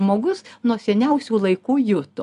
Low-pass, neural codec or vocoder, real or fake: 10.8 kHz; none; real